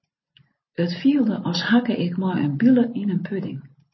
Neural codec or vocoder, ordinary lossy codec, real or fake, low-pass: none; MP3, 24 kbps; real; 7.2 kHz